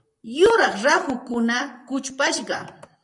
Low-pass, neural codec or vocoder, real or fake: 10.8 kHz; vocoder, 44.1 kHz, 128 mel bands, Pupu-Vocoder; fake